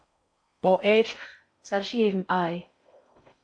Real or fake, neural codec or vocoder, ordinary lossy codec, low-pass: fake; codec, 16 kHz in and 24 kHz out, 0.6 kbps, FocalCodec, streaming, 4096 codes; AAC, 64 kbps; 9.9 kHz